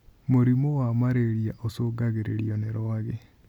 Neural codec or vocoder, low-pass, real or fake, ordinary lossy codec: none; 19.8 kHz; real; none